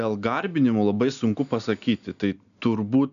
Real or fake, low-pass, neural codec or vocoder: real; 7.2 kHz; none